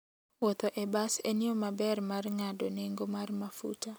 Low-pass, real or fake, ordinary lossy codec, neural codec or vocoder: none; real; none; none